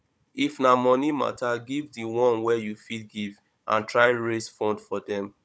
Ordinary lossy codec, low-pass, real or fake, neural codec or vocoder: none; none; fake; codec, 16 kHz, 16 kbps, FunCodec, trained on Chinese and English, 50 frames a second